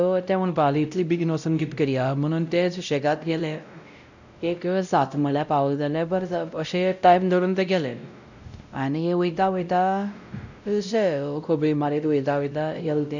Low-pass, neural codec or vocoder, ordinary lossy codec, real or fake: 7.2 kHz; codec, 16 kHz, 0.5 kbps, X-Codec, WavLM features, trained on Multilingual LibriSpeech; none; fake